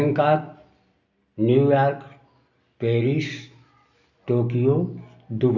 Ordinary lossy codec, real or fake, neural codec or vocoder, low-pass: none; real; none; 7.2 kHz